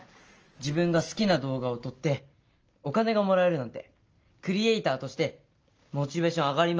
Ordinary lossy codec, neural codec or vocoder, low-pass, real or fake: Opus, 24 kbps; none; 7.2 kHz; real